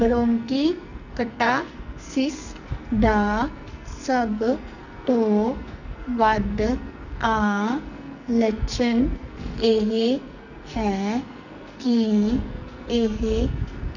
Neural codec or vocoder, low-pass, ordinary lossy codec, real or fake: codec, 44.1 kHz, 2.6 kbps, SNAC; 7.2 kHz; none; fake